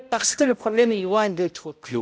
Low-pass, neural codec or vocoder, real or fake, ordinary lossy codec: none; codec, 16 kHz, 0.5 kbps, X-Codec, HuBERT features, trained on balanced general audio; fake; none